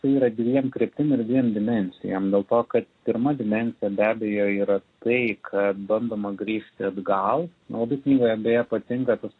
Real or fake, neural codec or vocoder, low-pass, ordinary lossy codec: real; none; 9.9 kHz; AAC, 32 kbps